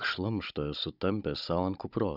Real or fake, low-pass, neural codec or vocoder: fake; 5.4 kHz; codec, 16 kHz, 16 kbps, FunCodec, trained on Chinese and English, 50 frames a second